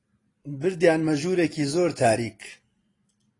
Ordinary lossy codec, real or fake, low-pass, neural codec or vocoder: AAC, 32 kbps; real; 10.8 kHz; none